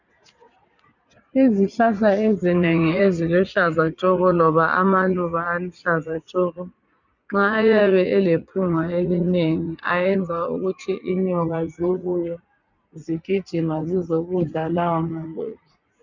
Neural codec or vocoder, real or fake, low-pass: vocoder, 44.1 kHz, 80 mel bands, Vocos; fake; 7.2 kHz